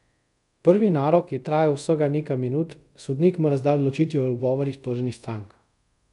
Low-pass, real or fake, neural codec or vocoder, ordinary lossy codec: 10.8 kHz; fake; codec, 24 kHz, 0.5 kbps, DualCodec; none